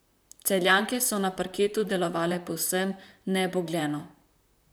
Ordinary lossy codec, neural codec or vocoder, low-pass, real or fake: none; vocoder, 44.1 kHz, 128 mel bands, Pupu-Vocoder; none; fake